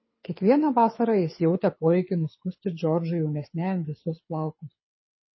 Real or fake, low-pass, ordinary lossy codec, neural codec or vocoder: fake; 7.2 kHz; MP3, 24 kbps; codec, 24 kHz, 6 kbps, HILCodec